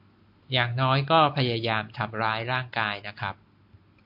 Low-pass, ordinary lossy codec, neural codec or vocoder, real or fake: 5.4 kHz; AAC, 48 kbps; none; real